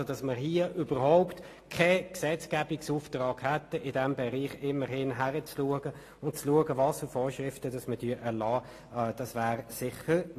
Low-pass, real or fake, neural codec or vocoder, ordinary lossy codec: 14.4 kHz; real; none; AAC, 48 kbps